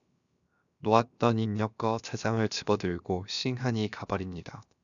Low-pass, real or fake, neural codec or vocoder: 7.2 kHz; fake; codec, 16 kHz, 0.7 kbps, FocalCodec